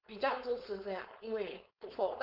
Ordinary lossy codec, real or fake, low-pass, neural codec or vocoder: none; fake; 5.4 kHz; codec, 16 kHz, 4.8 kbps, FACodec